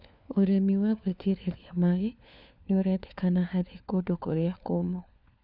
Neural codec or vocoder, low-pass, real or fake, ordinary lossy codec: codec, 16 kHz, 4 kbps, FunCodec, trained on LibriTTS, 50 frames a second; 5.4 kHz; fake; none